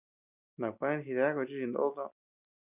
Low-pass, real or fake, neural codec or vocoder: 3.6 kHz; real; none